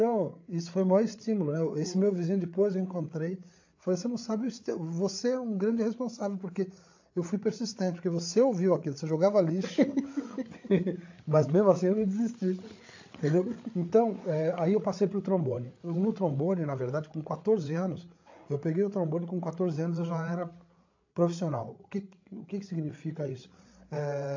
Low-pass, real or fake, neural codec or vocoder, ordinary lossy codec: 7.2 kHz; fake; codec, 16 kHz, 8 kbps, FreqCodec, larger model; AAC, 48 kbps